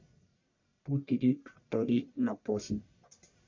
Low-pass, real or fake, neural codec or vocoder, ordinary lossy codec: 7.2 kHz; fake; codec, 44.1 kHz, 1.7 kbps, Pupu-Codec; MP3, 64 kbps